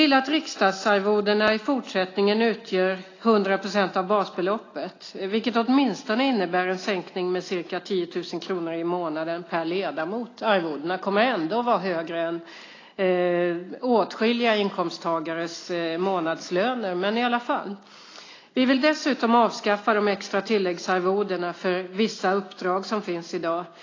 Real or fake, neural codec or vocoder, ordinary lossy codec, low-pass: real; none; AAC, 32 kbps; 7.2 kHz